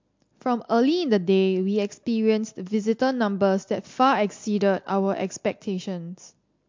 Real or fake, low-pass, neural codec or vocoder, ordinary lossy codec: real; 7.2 kHz; none; MP3, 48 kbps